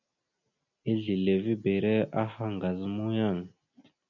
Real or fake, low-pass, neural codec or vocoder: real; 7.2 kHz; none